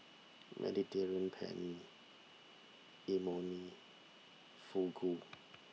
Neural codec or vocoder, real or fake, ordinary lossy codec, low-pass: none; real; none; none